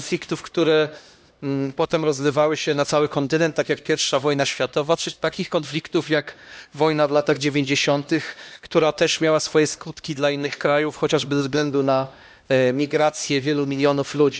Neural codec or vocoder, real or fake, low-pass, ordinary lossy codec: codec, 16 kHz, 1 kbps, X-Codec, HuBERT features, trained on LibriSpeech; fake; none; none